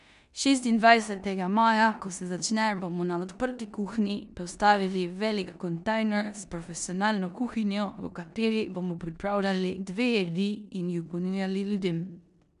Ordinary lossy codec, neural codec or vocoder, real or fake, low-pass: none; codec, 16 kHz in and 24 kHz out, 0.9 kbps, LongCat-Audio-Codec, four codebook decoder; fake; 10.8 kHz